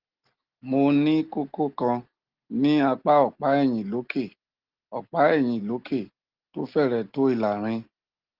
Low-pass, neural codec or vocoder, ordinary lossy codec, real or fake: 5.4 kHz; none; Opus, 16 kbps; real